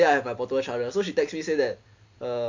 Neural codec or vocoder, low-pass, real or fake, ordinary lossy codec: none; 7.2 kHz; real; MP3, 48 kbps